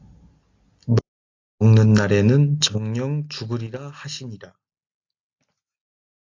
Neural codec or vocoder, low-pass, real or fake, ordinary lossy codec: none; 7.2 kHz; real; AAC, 48 kbps